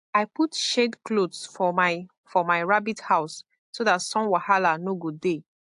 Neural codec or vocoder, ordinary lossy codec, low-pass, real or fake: none; AAC, 64 kbps; 10.8 kHz; real